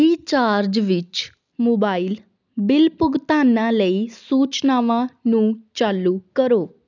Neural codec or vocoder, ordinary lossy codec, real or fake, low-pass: none; none; real; 7.2 kHz